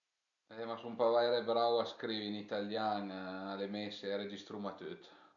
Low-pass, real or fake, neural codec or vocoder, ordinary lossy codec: 7.2 kHz; real; none; none